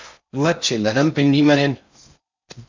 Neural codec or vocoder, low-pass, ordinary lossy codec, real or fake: codec, 16 kHz in and 24 kHz out, 0.6 kbps, FocalCodec, streaming, 4096 codes; 7.2 kHz; MP3, 64 kbps; fake